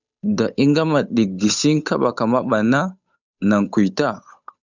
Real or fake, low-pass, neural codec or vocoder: fake; 7.2 kHz; codec, 16 kHz, 8 kbps, FunCodec, trained on Chinese and English, 25 frames a second